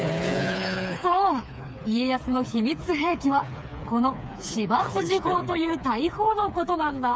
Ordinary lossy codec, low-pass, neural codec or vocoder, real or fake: none; none; codec, 16 kHz, 4 kbps, FreqCodec, smaller model; fake